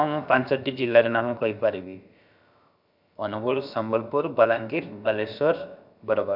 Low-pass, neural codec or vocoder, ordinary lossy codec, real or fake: 5.4 kHz; codec, 16 kHz, about 1 kbps, DyCAST, with the encoder's durations; none; fake